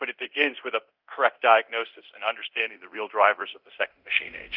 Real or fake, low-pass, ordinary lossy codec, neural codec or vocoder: fake; 5.4 kHz; Opus, 32 kbps; codec, 24 kHz, 0.9 kbps, DualCodec